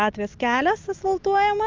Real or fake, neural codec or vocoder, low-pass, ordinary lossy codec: real; none; 7.2 kHz; Opus, 24 kbps